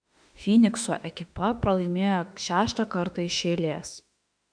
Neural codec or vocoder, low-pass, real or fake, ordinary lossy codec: autoencoder, 48 kHz, 32 numbers a frame, DAC-VAE, trained on Japanese speech; 9.9 kHz; fake; MP3, 96 kbps